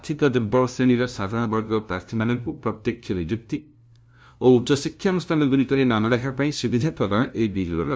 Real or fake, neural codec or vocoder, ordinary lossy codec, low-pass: fake; codec, 16 kHz, 0.5 kbps, FunCodec, trained on LibriTTS, 25 frames a second; none; none